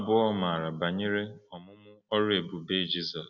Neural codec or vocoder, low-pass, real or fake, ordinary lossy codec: none; 7.2 kHz; real; none